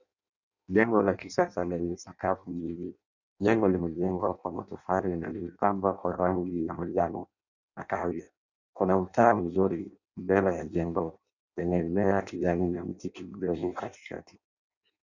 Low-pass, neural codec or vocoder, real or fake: 7.2 kHz; codec, 16 kHz in and 24 kHz out, 0.6 kbps, FireRedTTS-2 codec; fake